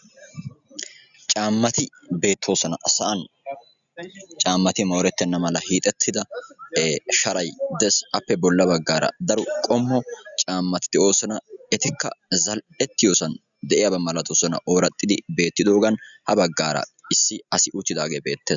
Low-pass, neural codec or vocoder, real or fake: 7.2 kHz; none; real